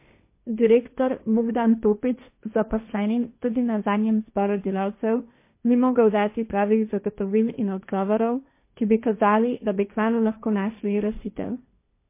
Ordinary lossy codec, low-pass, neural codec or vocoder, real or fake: MP3, 24 kbps; 3.6 kHz; codec, 16 kHz, 1.1 kbps, Voila-Tokenizer; fake